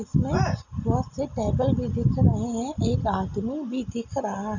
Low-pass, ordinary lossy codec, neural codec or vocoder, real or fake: 7.2 kHz; none; none; real